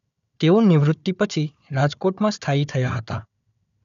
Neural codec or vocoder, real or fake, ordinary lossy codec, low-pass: codec, 16 kHz, 4 kbps, FunCodec, trained on Chinese and English, 50 frames a second; fake; none; 7.2 kHz